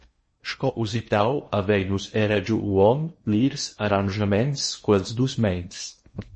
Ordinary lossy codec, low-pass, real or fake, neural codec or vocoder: MP3, 32 kbps; 9.9 kHz; fake; codec, 16 kHz in and 24 kHz out, 0.8 kbps, FocalCodec, streaming, 65536 codes